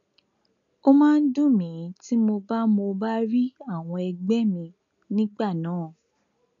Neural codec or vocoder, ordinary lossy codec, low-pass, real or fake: none; none; 7.2 kHz; real